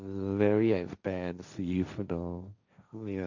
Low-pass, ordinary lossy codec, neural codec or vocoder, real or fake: 7.2 kHz; none; codec, 16 kHz, 1.1 kbps, Voila-Tokenizer; fake